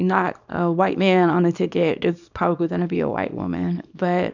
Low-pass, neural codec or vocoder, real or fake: 7.2 kHz; codec, 24 kHz, 0.9 kbps, WavTokenizer, small release; fake